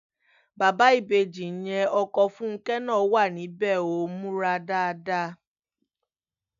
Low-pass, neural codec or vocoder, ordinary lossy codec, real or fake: 7.2 kHz; none; none; real